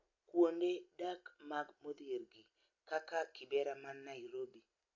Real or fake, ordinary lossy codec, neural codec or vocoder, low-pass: real; AAC, 48 kbps; none; 7.2 kHz